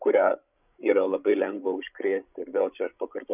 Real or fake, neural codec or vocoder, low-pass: fake; codec, 16 kHz, 16 kbps, FreqCodec, larger model; 3.6 kHz